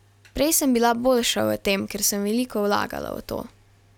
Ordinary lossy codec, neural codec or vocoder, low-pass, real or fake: none; none; 19.8 kHz; real